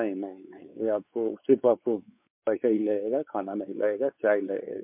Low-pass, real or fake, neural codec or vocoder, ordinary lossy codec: 3.6 kHz; fake; codec, 16 kHz, 4 kbps, X-Codec, WavLM features, trained on Multilingual LibriSpeech; MP3, 32 kbps